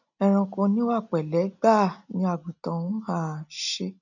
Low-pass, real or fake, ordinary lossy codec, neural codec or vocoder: 7.2 kHz; real; none; none